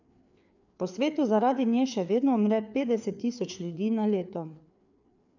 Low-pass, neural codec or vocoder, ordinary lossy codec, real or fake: 7.2 kHz; codec, 16 kHz, 4 kbps, FreqCodec, larger model; none; fake